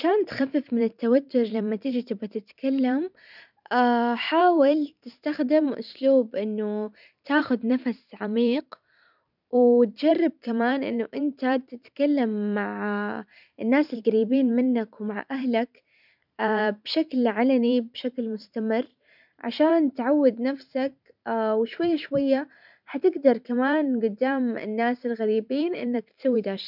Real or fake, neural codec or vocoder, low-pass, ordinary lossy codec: fake; vocoder, 44.1 kHz, 128 mel bands every 512 samples, BigVGAN v2; 5.4 kHz; none